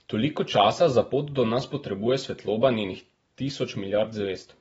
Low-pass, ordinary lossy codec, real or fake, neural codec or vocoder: 19.8 kHz; AAC, 24 kbps; real; none